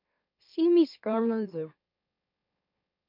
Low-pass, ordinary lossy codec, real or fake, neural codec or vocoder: 5.4 kHz; MP3, 48 kbps; fake; autoencoder, 44.1 kHz, a latent of 192 numbers a frame, MeloTTS